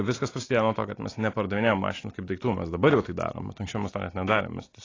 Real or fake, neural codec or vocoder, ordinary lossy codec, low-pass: real; none; AAC, 32 kbps; 7.2 kHz